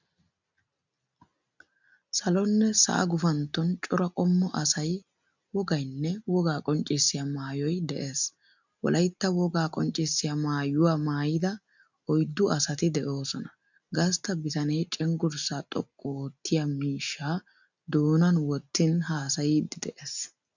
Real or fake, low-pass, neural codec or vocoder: real; 7.2 kHz; none